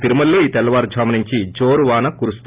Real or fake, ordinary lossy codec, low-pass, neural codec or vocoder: real; Opus, 32 kbps; 3.6 kHz; none